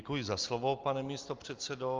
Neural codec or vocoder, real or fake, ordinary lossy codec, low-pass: none; real; Opus, 24 kbps; 7.2 kHz